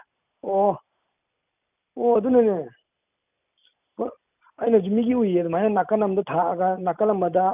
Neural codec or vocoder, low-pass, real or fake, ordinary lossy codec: none; 3.6 kHz; real; none